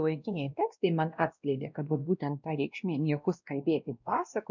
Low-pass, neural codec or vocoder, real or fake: 7.2 kHz; codec, 16 kHz, 1 kbps, X-Codec, WavLM features, trained on Multilingual LibriSpeech; fake